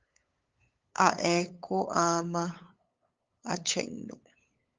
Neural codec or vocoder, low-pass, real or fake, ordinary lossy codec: codec, 16 kHz, 8 kbps, FunCodec, trained on LibriTTS, 25 frames a second; 7.2 kHz; fake; Opus, 32 kbps